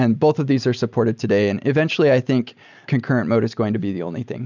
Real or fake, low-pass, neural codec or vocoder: real; 7.2 kHz; none